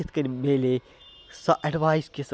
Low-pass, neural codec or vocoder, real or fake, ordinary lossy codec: none; none; real; none